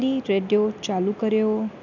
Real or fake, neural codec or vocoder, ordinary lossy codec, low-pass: real; none; none; 7.2 kHz